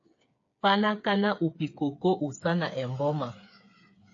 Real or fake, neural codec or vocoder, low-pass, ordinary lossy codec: fake; codec, 16 kHz, 8 kbps, FreqCodec, smaller model; 7.2 kHz; AAC, 48 kbps